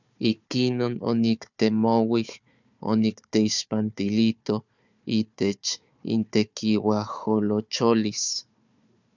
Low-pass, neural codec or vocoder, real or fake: 7.2 kHz; codec, 16 kHz, 4 kbps, FunCodec, trained on Chinese and English, 50 frames a second; fake